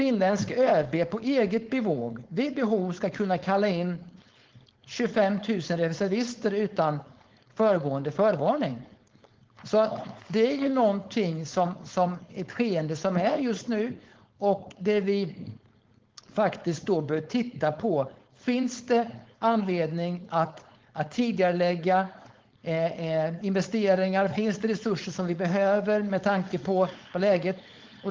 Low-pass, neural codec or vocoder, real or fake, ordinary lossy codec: 7.2 kHz; codec, 16 kHz, 4.8 kbps, FACodec; fake; Opus, 16 kbps